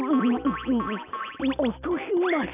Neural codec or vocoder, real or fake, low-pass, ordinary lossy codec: none; real; 3.6 kHz; none